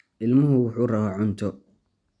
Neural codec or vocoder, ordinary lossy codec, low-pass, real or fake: none; none; 9.9 kHz; real